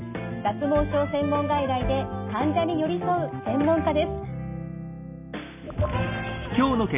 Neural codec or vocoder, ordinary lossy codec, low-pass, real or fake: none; none; 3.6 kHz; real